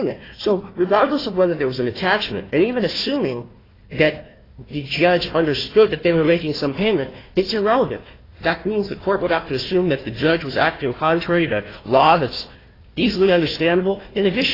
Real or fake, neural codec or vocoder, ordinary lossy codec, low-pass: fake; codec, 16 kHz, 1 kbps, FunCodec, trained on Chinese and English, 50 frames a second; AAC, 24 kbps; 5.4 kHz